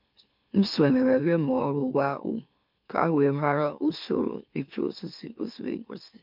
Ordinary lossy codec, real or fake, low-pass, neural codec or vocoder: MP3, 48 kbps; fake; 5.4 kHz; autoencoder, 44.1 kHz, a latent of 192 numbers a frame, MeloTTS